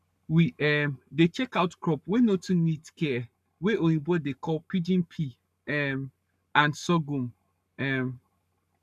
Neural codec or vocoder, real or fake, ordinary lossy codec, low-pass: codec, 44.1 kHz, 7.8 kbps, Pupu-Codec; fake; none; 14.4 kHz